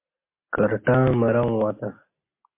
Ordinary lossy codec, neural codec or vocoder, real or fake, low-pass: MP3, 24 kbps; none; real; 3.6 kHz